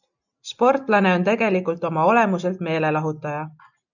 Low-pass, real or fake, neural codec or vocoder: 7.2 kHz; real; none